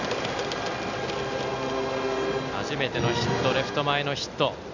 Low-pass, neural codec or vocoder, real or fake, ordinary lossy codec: 7.2 kHz; none; real; none